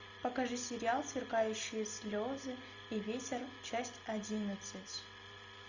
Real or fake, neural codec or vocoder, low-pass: real; none; 7.2 kHz